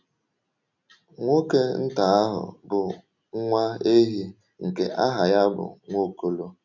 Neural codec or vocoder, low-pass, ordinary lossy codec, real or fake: none; 7.2 kHz; none; real